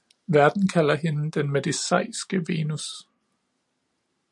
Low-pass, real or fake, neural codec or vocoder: 10.8 kHz; real; none